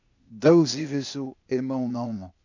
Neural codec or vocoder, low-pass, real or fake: codec, 16 kHz, 0.8 kbps, ZipCodec; 7.2 kHz; fake